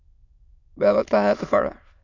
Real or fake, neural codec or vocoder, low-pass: fake; autoencoder, 22.05 kHz, a latent of 192 numbers a frame, VITS, trained on many speakers; 7.2 kHz